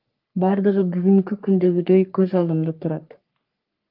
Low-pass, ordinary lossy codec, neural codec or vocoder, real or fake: 5.4 kHz; Opus, 32 kbps; codec, 44.1 kHz, 3.4 kbps, Pupu-Codec; fake